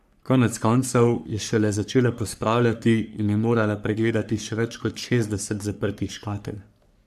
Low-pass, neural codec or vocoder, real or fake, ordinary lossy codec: 14.4 kHz; codec, 44.1 kHz, 3.4 kbps, Pupu-Codec; fake; AAC, 96 kbps